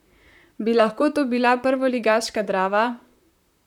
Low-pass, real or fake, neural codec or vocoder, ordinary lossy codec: 19.8 kHz; fake; vocoder, 44.1 kHz, 128 mel bands, Pupu-Vocoder; none